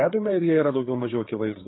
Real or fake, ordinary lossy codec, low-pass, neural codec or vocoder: fake; AAC, 16 kbps; 7.2 kHz; codec, 16 kHz, 4 kbps, FreqCodec, larger model